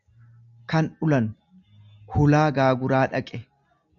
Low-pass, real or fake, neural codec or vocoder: 7.2 kHz; real; none